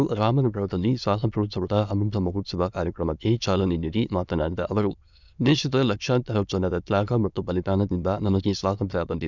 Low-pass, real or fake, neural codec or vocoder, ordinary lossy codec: 7.2 kHz; fake; autoencoder, 22.05 kHz, a latent of 192 numbers a frame, VITS, trained on many speakers; none